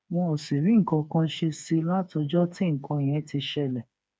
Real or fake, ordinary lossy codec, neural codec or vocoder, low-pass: fake; none; codec, 16 kHz, 4 kbps, FreqCodec, smaller model; none